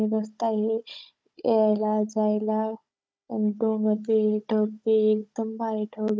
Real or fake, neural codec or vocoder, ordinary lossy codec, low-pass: fake; codec, 16 kHz, 16 kbps, FunCodec, trained on Chinese and English, 50 frames a second; none; none